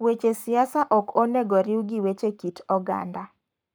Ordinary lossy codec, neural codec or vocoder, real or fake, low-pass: none; codec, 44.1 kHz, 7.8 kbps, Pupu-Codec; fake; none